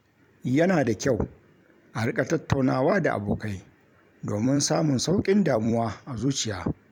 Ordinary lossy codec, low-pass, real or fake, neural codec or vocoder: MP3, 96 kbps; 19.8 kHz; fake; vocoder, 44.1 kHz, 128 mel bands every 256 samples, BigVGAN v2